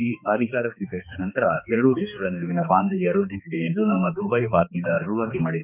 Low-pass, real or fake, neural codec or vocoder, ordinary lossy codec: 3.6 kHz; fake; codec, 16 kHz, 2 kbps, X-Codec, HuBERT features, trained on balanced general audio; none